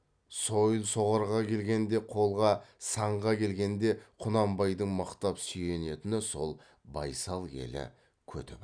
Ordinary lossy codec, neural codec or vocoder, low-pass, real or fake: none; none; 9.9 kHz; real